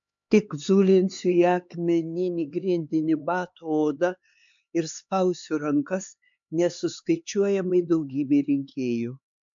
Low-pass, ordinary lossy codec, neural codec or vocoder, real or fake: 7.2 kHz; MP3, 64 kbps; codec, 16 kHz, 4 kbps, X-Codec, HuBERT features, trained on LibriSpeech; fake